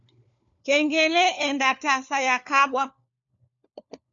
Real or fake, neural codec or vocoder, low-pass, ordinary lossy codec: fake; codec, 16 kHz, 4 kbps, FunCodec, trained on LibriTTS, 50 frames a second; 7.2 kHz; MP3, 96 kbps